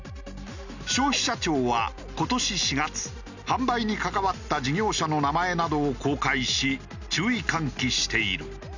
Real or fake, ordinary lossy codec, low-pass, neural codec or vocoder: real; none; 7.2 kHz; none